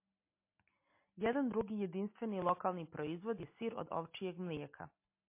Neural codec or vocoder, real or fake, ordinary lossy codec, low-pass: none; real; MP3, 24 kbps; 3.6 kHz